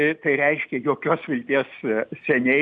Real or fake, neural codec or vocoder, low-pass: real; none; 9.9 kHz